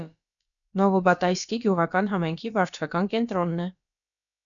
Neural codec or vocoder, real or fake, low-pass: codec, 16 kHz, about 1 kbps, DyCAST, with the encoder's durations; fake; 7.2 kHz